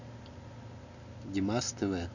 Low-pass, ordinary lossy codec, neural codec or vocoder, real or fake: 7.2 kHz; none; none; real